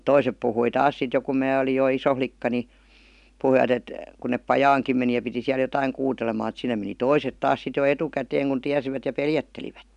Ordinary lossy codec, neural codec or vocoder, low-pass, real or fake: none; none; 10.8 kHz; real